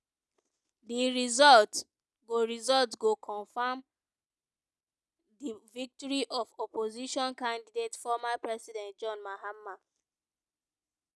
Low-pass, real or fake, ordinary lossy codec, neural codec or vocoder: none; real; none; none